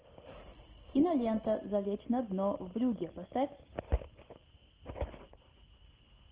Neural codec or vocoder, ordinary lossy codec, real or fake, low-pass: none; Opus, 16 kbps; real; 3.6 kHz